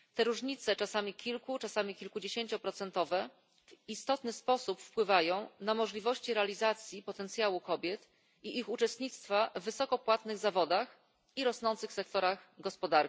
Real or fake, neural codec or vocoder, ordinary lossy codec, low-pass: real; none; none; none